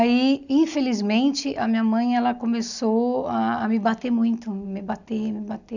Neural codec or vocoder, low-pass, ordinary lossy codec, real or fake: none; 7.2 kHz; none; real